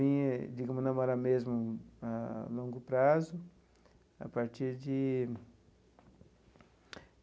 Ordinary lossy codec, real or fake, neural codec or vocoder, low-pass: none; real; none; none